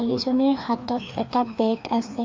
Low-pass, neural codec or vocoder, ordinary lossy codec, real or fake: 7.2 kHz; codec, 16 kHz, 2 kbps, FreqCodec, larger model; MP3, 48 kbps; fake